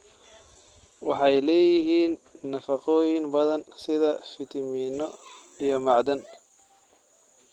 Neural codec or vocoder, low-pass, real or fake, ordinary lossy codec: autoencoder, 48 kHz, 128 numbers a frame, DAC-VAE, trained on Japanese speech; 19.8 kHz; fake; Opus, 16 kbps